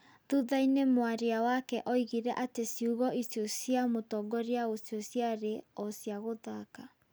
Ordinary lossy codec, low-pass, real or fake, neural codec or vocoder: none; none; real; none